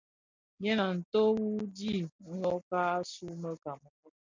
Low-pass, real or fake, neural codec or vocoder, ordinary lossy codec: 7.2 kHz; real; none; MP3, 64 kbps